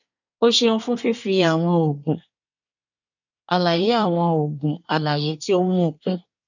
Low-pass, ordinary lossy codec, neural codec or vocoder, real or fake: 7.2 kHz; none; codec, 24 kHz, 1 kbps, SNAC; fake